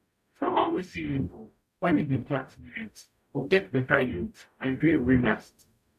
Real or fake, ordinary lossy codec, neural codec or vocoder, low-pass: fake; none; codec, 44.1 kHz, 0.9 kbps, DAC; 14.4 kHz